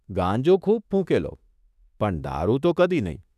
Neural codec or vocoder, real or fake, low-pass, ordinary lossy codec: autoencoder, 48 kHz, 32 numbers a frame, DAC-VAE, trained on Japanese speech; fake; 14.4 kHz; none